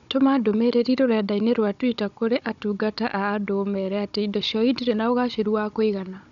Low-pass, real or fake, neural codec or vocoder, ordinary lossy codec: 7.2 kHz; fake; codec, 16 kHz, 16 kbps, FunCodec, trained on Chinese and English, 50 frames a second; none